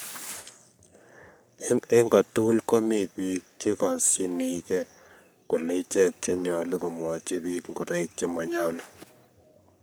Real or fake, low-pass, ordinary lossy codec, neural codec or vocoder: fake; none; none; codec, 44.1 kHz, 3.4 kbps, Pupu-Codec